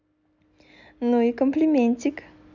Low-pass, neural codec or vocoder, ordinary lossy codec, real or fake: 7.2 kHz; none; none; real